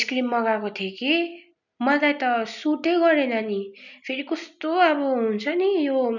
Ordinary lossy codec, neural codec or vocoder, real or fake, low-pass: none; none; real; 7.2 kHz